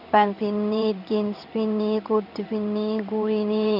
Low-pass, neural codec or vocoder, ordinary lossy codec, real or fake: 5.4 kHz; codec, 16 kHz in and 24 kHz out, 1 kbps, XY-Tokenizer; none; fake